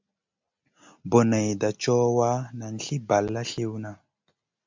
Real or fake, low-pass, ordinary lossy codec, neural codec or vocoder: real; 7.2 kHz; MP3, 64 kbps; none